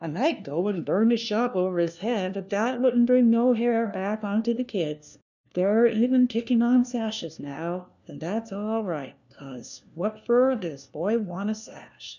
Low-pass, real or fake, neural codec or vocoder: 7.2 kHz; fake; codec, 16 kHz, 1 kbps, FunCodec, trained on LibriTTS, 50 frames a second